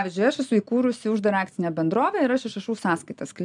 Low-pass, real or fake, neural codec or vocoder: 10.8 kHz; real; none